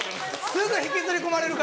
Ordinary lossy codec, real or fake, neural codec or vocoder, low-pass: none; real; none; none